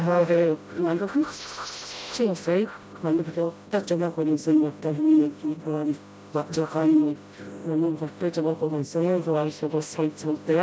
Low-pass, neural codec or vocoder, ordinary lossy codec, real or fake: none; codec, 16 kHz, 0.5 kbps, FreqCodec, smaller model; none; fake